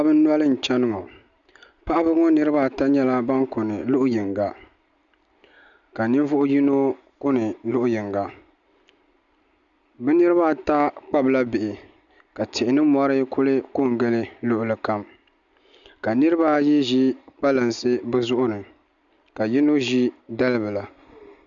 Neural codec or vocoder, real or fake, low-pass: none; real; 7.2 kHz